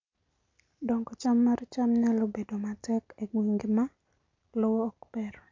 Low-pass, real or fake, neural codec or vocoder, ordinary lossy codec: 7.2 kHz; real; none; MP3, 48 kbps